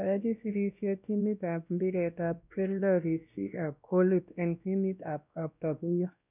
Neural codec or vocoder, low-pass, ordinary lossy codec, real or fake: codec, 16 kHz, 1 kbps, X-Codec, WavLM features, trained on Multilingual LibriSpeech; 3.6 kHz; none; fake